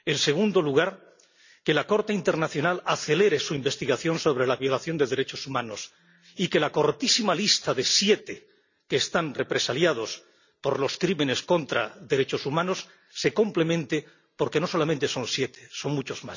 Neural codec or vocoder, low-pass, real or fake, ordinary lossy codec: none; 7.2 kHz; real; none